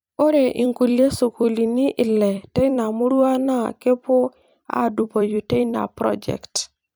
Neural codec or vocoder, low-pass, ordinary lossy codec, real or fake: vocoder, 44.1 kHz, 128 mel bands every 256 samples, BigVGAN v2; none; none; fake